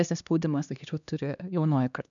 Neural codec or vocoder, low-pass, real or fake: codec, 16 kHz, 2 kbps, X-Codec, HuBERT features, trained on LibriSpeech; 7.2 kHz; fake